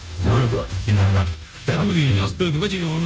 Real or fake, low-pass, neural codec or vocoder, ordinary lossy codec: fake; none; codec, 16 kHz, 0.5 kbps, FunCodec, trained on Chinese and English, 25 frames a second; none